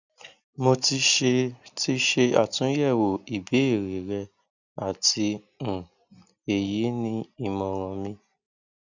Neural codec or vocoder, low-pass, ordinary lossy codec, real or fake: none; 7.2 kHz; none; real